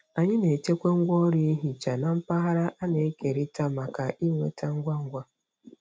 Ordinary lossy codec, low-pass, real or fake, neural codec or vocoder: none; none; real; none